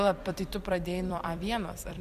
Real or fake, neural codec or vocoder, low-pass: fake; vocoder, 44.1 kHz, 128 mel bands every 256 samples, BigVGAN v2; 14.4 kHz